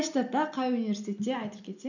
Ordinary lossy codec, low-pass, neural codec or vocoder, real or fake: none; 7.2 kHz; none; real